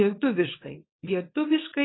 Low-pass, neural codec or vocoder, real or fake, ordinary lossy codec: 7.2 kHz; codec, 24 kHz, 0.9 kbps, WavTokenizer, small release; fake; AAC, 16 kbps